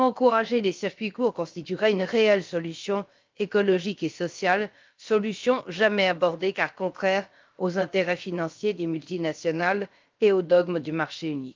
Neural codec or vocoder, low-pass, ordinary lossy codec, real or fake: codec, 16 kHz, about 1 kbps, DyCAST, with the encoder's durations; 7.2 kHz; Opus, 32 kbps; fake